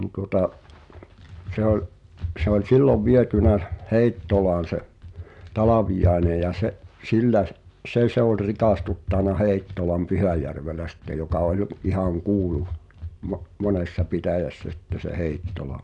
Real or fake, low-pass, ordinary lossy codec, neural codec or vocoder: real; 10.8 kHz; none; none